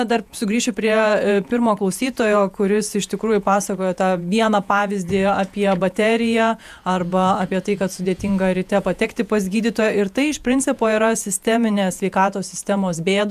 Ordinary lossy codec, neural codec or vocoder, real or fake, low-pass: MP3, 96 kbps; vocoder, 48 kHz, 128 mel bands, Vocos; fake; 14.4 kHz